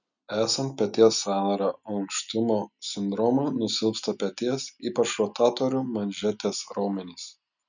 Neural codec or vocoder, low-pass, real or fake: none; 7.2 kHz; real